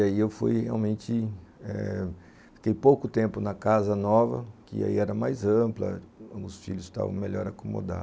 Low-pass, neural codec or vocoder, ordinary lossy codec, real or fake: none; none; none; real